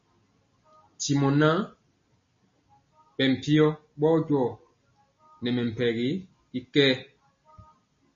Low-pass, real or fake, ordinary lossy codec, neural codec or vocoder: 7.2 kHz; real; MP3, 32 kbps; none